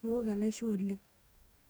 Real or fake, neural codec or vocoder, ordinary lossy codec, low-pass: fake; codec, 44.1 kHz, 2.6 kbps, DAC; none; none